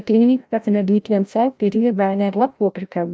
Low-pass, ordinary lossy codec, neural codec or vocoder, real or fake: none; none; codec, 16 kHz, 0.5 kbps, FreqCodec, larger model; fake